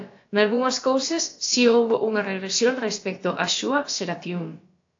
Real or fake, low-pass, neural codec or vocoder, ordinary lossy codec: fake; 7.2 kHz; codec, 16 kHz, about 1 kbps, DyCAST, with the encoder's durations; AAC, 48 kbps